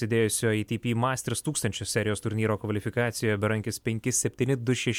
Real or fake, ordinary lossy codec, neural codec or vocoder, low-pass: real; MP3, 96 kbps; none; 19.8 kHz